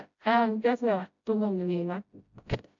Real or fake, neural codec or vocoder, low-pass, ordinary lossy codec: fake; codec, 16 kHz, 0.5 kbps, FreqCodec, smaller model; 7.2 kHz; MP3, 64 kbps